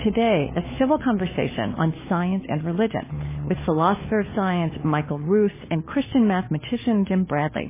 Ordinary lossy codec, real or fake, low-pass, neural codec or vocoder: MP3, 16 kbps; fake; 3.6 kHz; codec, 16 kHz, 4 kbps, FunCodec, trained on LibriTTS, 50 frames a second